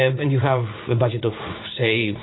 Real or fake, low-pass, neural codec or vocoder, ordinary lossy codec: fake; 7.2 kHz; vocoder, 44.1 kHz, 80 mel bands, Vocos; AAC, 16 kbps